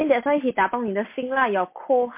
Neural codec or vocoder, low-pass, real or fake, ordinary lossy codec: none; 3.6 kHz; real; MP3, 24 kbps